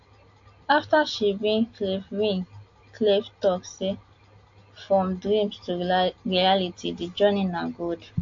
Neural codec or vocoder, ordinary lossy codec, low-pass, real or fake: none; AAC, 48 kbps; 7.2 kHz; real